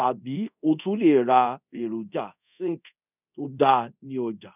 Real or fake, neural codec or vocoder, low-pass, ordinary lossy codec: fake; codec, 24 kHz, 0.5 kbps, DualCodec; 3.6 kHz; none